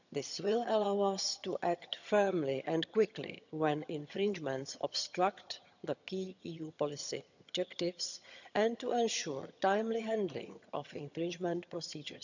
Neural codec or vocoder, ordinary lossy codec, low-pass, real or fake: vocoder, 22.05 kHz, 80 mel bands, HiFi-GAN; none; 7.2 kHz; fake